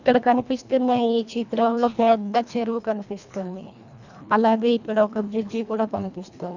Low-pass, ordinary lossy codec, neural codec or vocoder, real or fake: 7.2 kHz; none; codec, 24 kHz, 1.5 kbps, HILCodec; fake